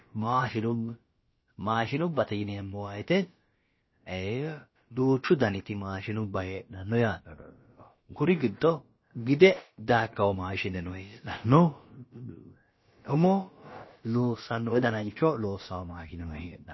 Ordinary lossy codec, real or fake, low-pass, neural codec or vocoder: MP3, 24 kbps; fake; 7.2 kHz; codec, 16 kHz, about 1 kbps, DyCAST, with the encoder's durations